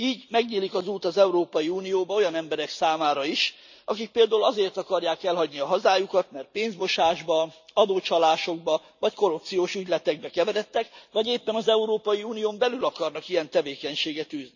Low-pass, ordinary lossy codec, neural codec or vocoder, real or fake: 7.2 kHz; none; none; real